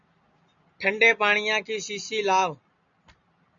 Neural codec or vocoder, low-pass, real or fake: none; 7.2 kHz; real